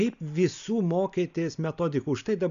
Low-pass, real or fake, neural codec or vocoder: 7.2 kHz; real; none